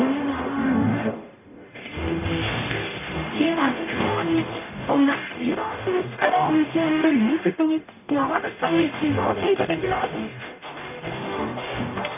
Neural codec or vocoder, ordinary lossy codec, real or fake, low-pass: codec, 44.1 kHz, 0.9 kbps, DAC; none; fake; 3.6 kHz